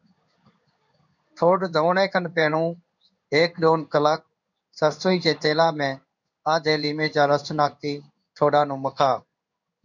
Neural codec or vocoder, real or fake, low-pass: codec, 16 kHz in and 24 kHz out, 1 kbps, XY-Tokenizer; fake; 7.2 kHz